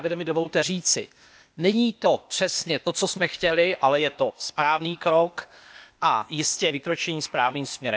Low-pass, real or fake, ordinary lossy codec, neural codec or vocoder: none; fake; none; codec, 16 kHz, 0.8 kbps, ZipCodec